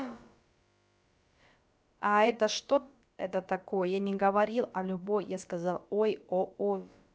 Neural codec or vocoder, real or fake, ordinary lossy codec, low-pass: codec, 16 kHz, about 1 kbps, DyCAST, with the encoder's durations; fake; none; none